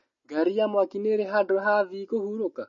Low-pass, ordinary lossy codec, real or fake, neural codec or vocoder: 7.2 kHz; MP3, 32 kbps; real; none